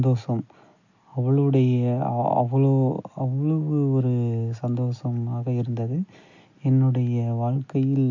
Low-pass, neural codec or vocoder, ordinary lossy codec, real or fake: 7.2 kHz; none; AAC, 48 kbps; real